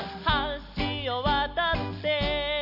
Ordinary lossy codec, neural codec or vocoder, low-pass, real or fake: none; none; 5.4 kHz; real